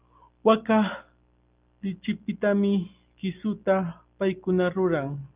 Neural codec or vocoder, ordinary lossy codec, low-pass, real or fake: none; Opus, 24 kbps; 3.6 kHz; real